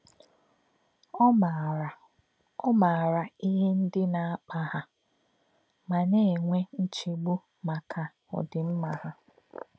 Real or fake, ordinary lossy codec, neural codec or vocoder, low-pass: real; none; none; none